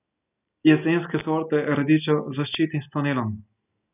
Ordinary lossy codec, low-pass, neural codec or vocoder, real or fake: none; 3.6 kHz; none; real